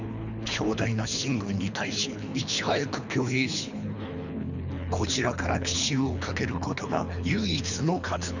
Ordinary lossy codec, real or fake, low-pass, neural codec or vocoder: none; fake; 7.2 kHz; codec, 24 kHz, 3 kbps, HILCodec